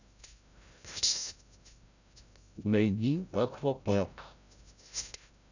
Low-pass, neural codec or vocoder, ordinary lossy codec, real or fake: 7.2 kHz; codec, 16 kHz, 0.5 kbps, FreqCodec, larger model; none; fake